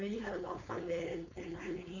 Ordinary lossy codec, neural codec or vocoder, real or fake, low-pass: none; codec, 16 kHz, 4.8 kbps, FACodec; fake; 7.2 kHz